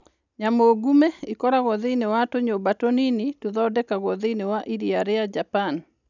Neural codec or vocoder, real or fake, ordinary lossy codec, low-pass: none; real; none; 7.2 kHz